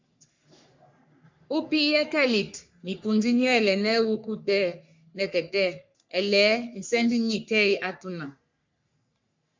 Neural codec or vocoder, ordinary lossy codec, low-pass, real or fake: codec, 44.1 kHz, 3.4 kbps, Pupu-Codec; MP3, 64 kbps; 7.2 kHz; fake